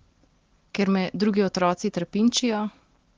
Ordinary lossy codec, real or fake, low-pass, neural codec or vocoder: Opus, 16 kbps; real; 7.2 kHz; none